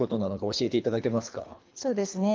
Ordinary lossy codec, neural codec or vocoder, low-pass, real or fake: Opus, 24 kbps; codec, 24 kHz, 3 kbps, HILCodec; 7.2 kHz; fake